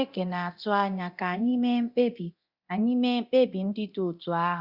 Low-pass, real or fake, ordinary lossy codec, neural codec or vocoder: 5.4 kHz; fake; Opus, 64 kbps; codec, 24 kHz, 0.9 kbps, DualCodec